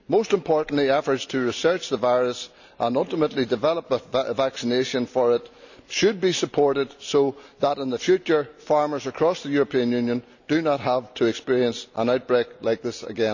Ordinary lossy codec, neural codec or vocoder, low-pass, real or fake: none; none; 7.2 kHz; real